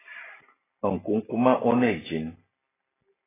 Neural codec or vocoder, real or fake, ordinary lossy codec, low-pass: none; real; AAC, 16 kbps; 3.6 kHz